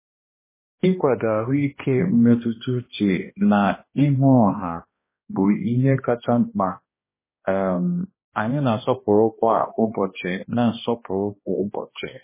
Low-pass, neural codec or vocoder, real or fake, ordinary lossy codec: 3.6 kHz; codec, 16 kHz, 1 kbps, X-Codec, HuBERT features, trained on balanced general audio; fake; MP3, 16 kbps